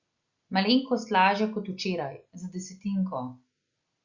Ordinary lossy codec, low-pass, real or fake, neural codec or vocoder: Opus, 64 kbps; 7.2 kHz; real; none